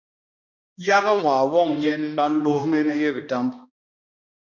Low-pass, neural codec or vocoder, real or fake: 7.2 kHz; codec, 16 kHz, 1 kbps, X-Codec, HuBERT features, trained on balanced general audio; fake